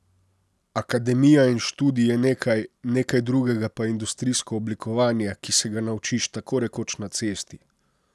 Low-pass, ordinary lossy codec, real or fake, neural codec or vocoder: none; none; real; none